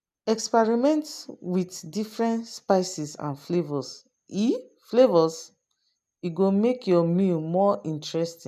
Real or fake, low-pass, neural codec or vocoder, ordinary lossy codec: real; 14.4 kHz; none; none